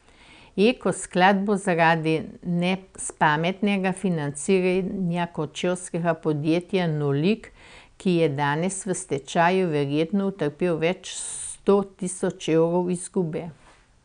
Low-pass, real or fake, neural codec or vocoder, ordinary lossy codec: 9.9 kHz; real; none; none